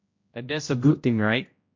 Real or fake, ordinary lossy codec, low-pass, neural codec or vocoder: fake; MP3, 48 kbps; 7.2 kHz; codec, 16 kHz, 0.5 kbps, X-Codec, HuBERT features, trained on general audio